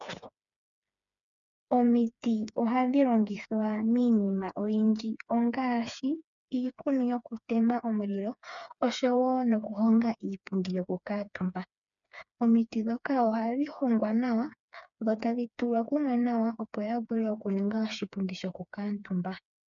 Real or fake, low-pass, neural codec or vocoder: fake; 7.2 kHz; codec, 16 kHz, 4 kbps, FreqCodec, smaller model